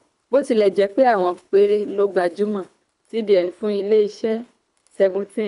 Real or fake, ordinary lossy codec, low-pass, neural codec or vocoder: fake; none; 10.8 kHz; codec, 24 kHz, 3 kbps, HILCodec